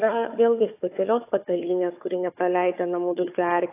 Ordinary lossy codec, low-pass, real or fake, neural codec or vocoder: AAC, 24 kbps; 3.6 kHz; fake; codec, 16 kHz, 4 kbps, FunCodec, trained on Chinese and English, 50 frames a second